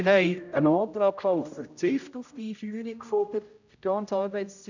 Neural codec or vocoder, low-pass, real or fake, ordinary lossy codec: codec, 16 kHz, 0.5 kbps, X-Codec, HuBERT features, trained on general audio; 7.2 kHz; fake; none